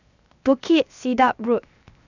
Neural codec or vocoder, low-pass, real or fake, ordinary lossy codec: codec, 16 kHz, 0.7 kbps, FocalCodec; 7.2 kHz; fake; none